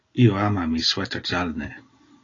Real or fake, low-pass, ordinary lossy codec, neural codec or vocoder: real; 7.2 kHz; AAC, 32 kbps; none